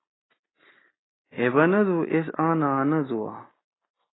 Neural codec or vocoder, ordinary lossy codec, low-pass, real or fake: none; AAC, 16 kbps; 7.2 kHz; real